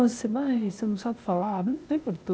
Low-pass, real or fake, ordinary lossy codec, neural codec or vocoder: none; fake; none; codec, 16 kHz, 0.8 kbps, ZipCodec